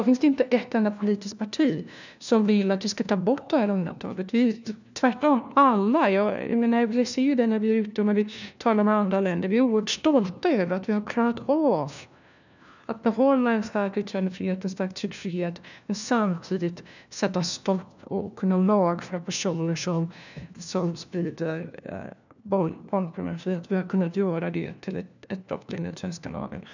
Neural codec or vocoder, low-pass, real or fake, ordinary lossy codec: codec, 16 kHz, 1 kbps, FunCodec, trained on LibriTTS, 50 frames a second; 7.2 kHz; fake; none